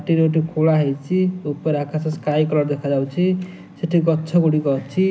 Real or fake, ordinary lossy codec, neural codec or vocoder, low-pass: real; none; none; none